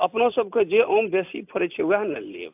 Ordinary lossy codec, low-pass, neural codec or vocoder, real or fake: AAC, 32 kbps; 3.6 kHz; none; real